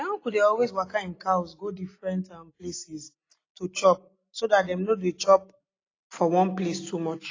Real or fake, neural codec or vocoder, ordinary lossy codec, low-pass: real; none; AAC, 32 kbps; 7.2 kHz